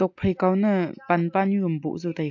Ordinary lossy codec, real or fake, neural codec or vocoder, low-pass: AAC, 48 kbps; real; none; 7.2 kHz